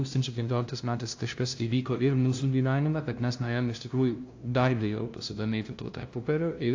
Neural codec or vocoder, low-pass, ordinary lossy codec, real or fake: codec, 16 kHz, 0.5 kbps, FunCodec, trained on LibriTTS, 25 frames a second; 7.2 kHz; AAC, 48 kbps; fake